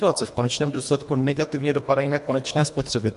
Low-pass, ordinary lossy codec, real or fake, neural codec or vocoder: 10.8 kHz; MP3, 64 kbps; fake; codec, 24 kHz, 1.5 kbps, HILCodec